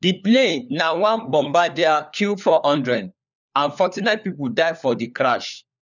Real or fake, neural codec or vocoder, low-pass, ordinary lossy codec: fake; codec, 16 kHz, 4 kbps, FunCodec, trained on LibriTTS, 50 frames a second; 7.2 kHz; none